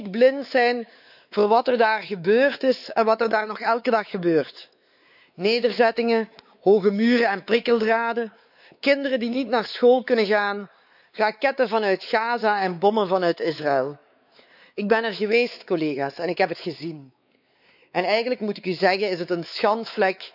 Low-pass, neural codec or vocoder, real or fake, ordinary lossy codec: 5.4 kHz; codec, 16 kHz, 4 kbps, X-Codec, WavLM features, trained on Multilingual LibriSpeech; fake; none